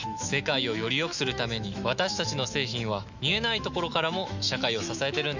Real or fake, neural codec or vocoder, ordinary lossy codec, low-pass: fake; autoencoder, 48 kHz, 128 numbers a frame, DAC-VAE, trained on Japanese speech; none; 7.2 kHz